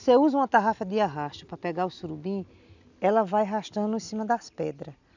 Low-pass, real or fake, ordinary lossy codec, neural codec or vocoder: 7.2 kHz; real; none; none